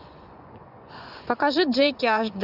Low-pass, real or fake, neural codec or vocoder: 5.4 kHz; fake; vocoder, 24 kHz, 100 mel bands, Vocos